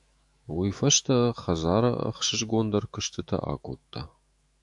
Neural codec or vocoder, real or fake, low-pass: autoencoder, 48 kHz, 128 numbers a frame, DAC-VAE, trained on Japanese speech; fake; 10.8 kHz